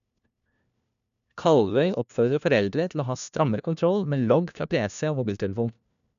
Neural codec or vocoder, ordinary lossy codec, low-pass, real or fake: codec, 16 kHz, 1 kbps, FunCodec, trained on LibriTTS, 50 frames a second; none; 7.2 kHz; fake